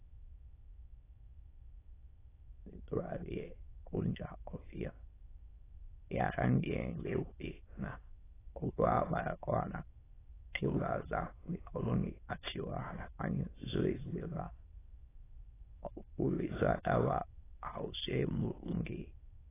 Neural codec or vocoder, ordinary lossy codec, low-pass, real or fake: autoencoder, 22.05 kHz, a latent of 192 numbers a frame, VITS, trained on many speakers; AAC, 16 kbps; 3.6 kHz; fake